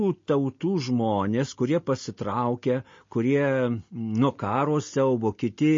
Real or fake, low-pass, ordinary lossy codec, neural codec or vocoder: real; 7.2 kHz; MP3, 32 kbps; none